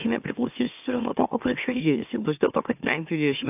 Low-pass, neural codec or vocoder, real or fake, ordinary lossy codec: 3.6 kHz; autoencoder, 44.1 kHz, a latent of 192 numbers a frame, MeloTTS; fake; AAC, 32 kbps